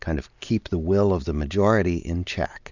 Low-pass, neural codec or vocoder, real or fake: 7.2 kHz; none; real